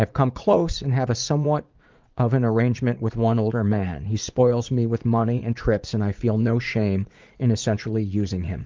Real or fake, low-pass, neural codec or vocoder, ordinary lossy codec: fake; 7.2 kHz; vocoder, 44.1 kHz, 80 mel bands, Vocos; Opus, 24 kbps